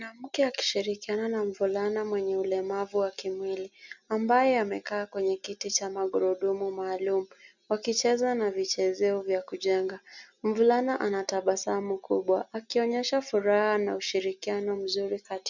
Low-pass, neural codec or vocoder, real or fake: 7.2 kHz; none; real